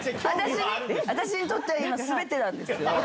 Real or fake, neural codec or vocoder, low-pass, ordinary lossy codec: real; none; none; none